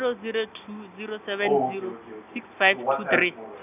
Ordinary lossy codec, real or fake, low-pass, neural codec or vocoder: none; real; 3.6 kHz; none